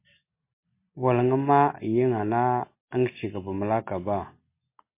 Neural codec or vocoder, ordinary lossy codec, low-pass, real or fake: none; MP3, 24 kbps; 3.6 kHz; real